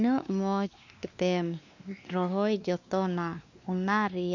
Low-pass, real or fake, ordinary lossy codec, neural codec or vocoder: 7.2 kHz; fake; none; codec, 16 kHz, 2 kbps, X-Codec, WavLM features, trained on Multilingual LibriSpeech